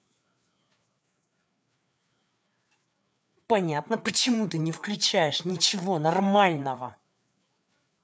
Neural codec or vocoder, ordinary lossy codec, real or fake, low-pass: codec, 16 kHz, 4 kbps, FreqCodec, larger model; none; fake; none